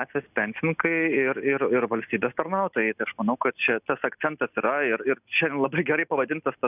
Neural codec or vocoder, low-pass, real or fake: none; 3.6 kHz; real